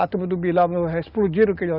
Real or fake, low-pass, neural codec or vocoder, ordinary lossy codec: real; 5.4 kHz; none; Opus, 64 kbps